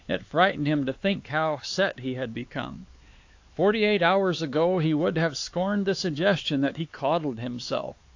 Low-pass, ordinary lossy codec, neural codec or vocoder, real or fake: 7.2 kHz; AAC, 48 kbps; codec, 16 kHz, 4 kbps, X-Codec, HuBERT features, trained on LibriSpeech; fake